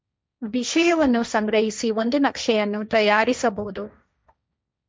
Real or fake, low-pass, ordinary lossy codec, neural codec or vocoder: fake; none; none; codec, 16 kHz, 1.1 kbps, Voila-Tokenizer